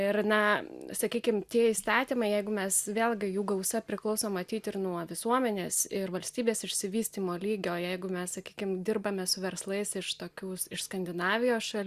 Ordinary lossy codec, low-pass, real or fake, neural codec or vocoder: Opus, 64 kbps; 14.4 kHz; real; none